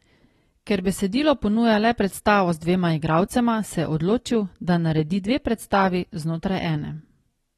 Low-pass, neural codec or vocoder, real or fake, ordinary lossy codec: 19.8 kHz; none; real; AAC, 32 kbps